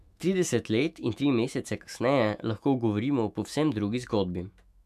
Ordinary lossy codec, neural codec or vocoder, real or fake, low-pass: none; autoencoder, 48 kHz, 128 numbers a frame, DAC-VAE, trained on Japanese speech; fake; 14.4 kHz